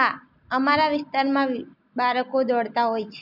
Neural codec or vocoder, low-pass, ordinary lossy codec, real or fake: none; 5.4 kHz; none; real